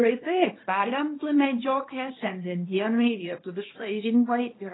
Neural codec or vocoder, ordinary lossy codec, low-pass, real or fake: codec, 24 kHz, 0.9 kbps, WavTokenizer, small release; AAC, 16 kbps; 7.2 kHz; fake